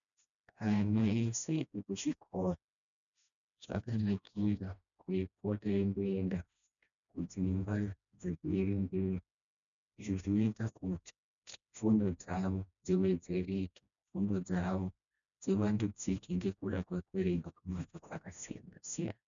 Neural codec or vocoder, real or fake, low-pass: codec, 16 kHz, 1 kbps, FreqCodec, smaller model; fake; 7.2 kHz